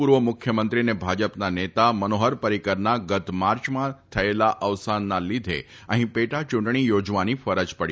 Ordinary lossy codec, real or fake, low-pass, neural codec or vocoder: none; real; none; none